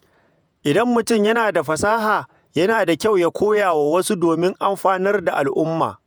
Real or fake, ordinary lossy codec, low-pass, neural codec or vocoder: fake; none; none; vocoder, 48 kHz, 128 mel bands, Vocos